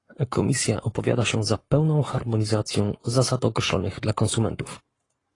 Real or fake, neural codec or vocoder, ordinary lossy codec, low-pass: fake; codec, 44.1 kHz, 7.8 kbps, Pupu-Codec; AAC, 32 kbps; 10.8 kHz